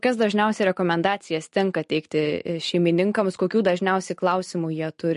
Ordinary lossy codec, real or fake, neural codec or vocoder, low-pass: MP3, 48 kbps; real; none; 10.8 kHz